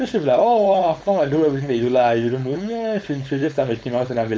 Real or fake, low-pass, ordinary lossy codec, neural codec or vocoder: fake; none; none; codec, 16 kHz, 4.8 kbps, FACodec